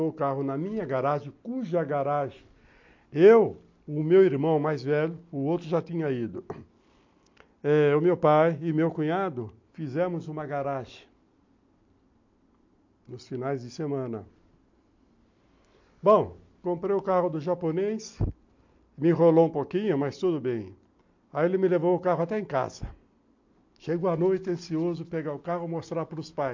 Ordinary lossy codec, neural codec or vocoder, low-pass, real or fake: MP3, 48 kbps; none; 7.2 kHz; real